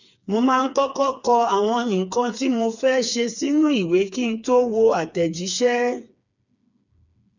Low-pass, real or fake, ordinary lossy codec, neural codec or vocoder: 7.2 kHz; fake; none; codec, 16 kHz, 4 kbps, FreqCodec, smaller model